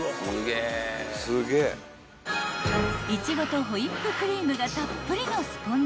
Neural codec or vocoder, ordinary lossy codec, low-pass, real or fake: none; none; none; real